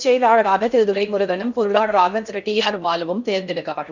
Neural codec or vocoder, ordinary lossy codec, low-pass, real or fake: codec, 16 kHz in and 24 kHz out, 0.6 kbps, FocalCodec, streaming, 2048 codes; none; 7.2 kHz; fake